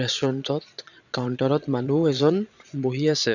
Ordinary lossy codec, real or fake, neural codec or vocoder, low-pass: none; fake; vocoder, 22.05 kHz, 80 mel bands, Vocos; 7.2 kHz